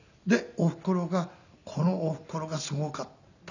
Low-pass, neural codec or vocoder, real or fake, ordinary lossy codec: 7.2 kHz; none; real; none